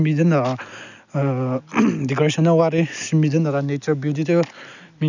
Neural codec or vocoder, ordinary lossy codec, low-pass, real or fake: none; none; 7.2 kHz; real